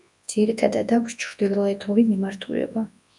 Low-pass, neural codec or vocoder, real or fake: 10.8 kHz; codec, 24 kHz, 0.9 kbps, WavTokenizer, large speech release; fake